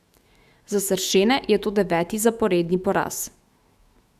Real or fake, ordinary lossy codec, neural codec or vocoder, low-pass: fake; Opus, 64 kbps; autoencoder, 48 kHz, 128 numbers a frame, DAC-VAE, trained on Japanese speech; 14.4 kHz